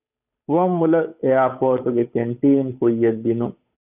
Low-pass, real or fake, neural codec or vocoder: 3.6 kHz; fake; codec, 16 kHz, 8 kbps, FunCodec, trained on Chinese and English, 25 frames a second